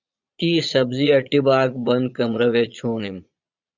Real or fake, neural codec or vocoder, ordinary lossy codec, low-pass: fake; vocoder, 22.05 kHz, 80 mel bands, Vocos; Opus, 64 kbps; 7.2 kHz